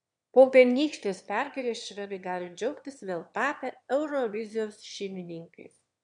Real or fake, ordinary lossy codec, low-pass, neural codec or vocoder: fake; MP3, 64 kbps; 9.9 kHz; autoencoder, 22.05 kHz, a latent of 192 numbers a frame, VITS, trained on one speaker